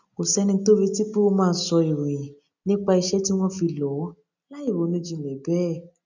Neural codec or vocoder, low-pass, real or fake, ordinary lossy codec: none; 7.2 kHz; real; none